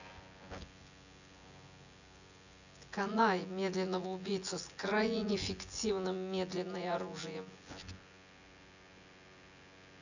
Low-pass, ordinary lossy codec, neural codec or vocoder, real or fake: 7.2 kHz; none; vocoder, 24 kHz, 100 mel bands, Vocos; fake